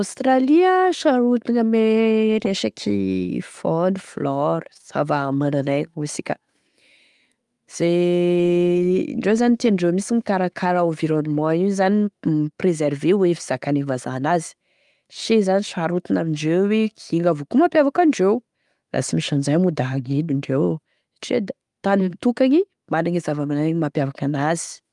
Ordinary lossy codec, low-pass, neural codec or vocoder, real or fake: Opus, 32 kbps; 10.8 kHz; autoencoder, 48 kHz, 128 numbers a frame, DAC-VAE, trained on Japanese speech; fake